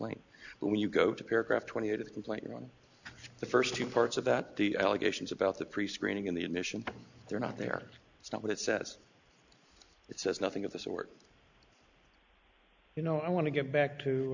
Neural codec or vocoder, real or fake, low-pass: none; real; 7.2 kHz